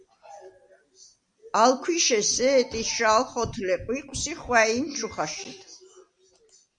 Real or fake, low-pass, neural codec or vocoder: real; 9.9 kHz; none